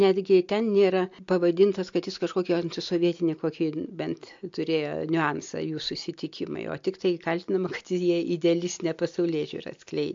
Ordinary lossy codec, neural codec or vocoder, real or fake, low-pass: MP3, 48 kbps; none; real; 7.2 kHz